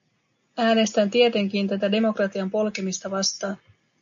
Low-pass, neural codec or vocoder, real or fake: 7.2 kHz; none; real